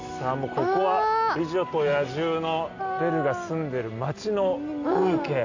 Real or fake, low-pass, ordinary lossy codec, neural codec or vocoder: real; 7.2 kHz; AAC, 48 kbps; none